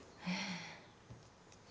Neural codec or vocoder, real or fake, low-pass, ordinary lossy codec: none; real; none; none